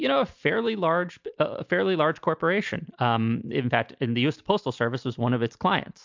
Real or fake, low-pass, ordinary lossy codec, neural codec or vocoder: real; 7.2 kHz; MP3, 64 kbps; none